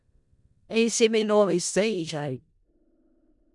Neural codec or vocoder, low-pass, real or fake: codec, 16 kHz in and 24 kHz out, 0.4 kbps, LongCat-Audio-Codec, four codebook decoder; 10.8 kHz; fake